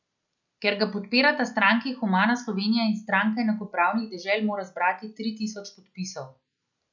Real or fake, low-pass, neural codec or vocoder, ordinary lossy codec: real; 7.2 kHz; none; none